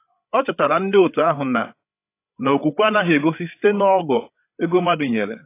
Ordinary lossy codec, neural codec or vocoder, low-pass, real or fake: AAC, 24 kbps; codec, 16 kHz, 8 kbps, FreqCodec, larger model; 3.6 kHz; fake